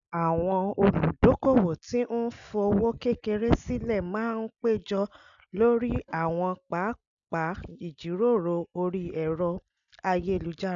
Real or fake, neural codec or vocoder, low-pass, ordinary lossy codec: real; none; 7.2 kHz; none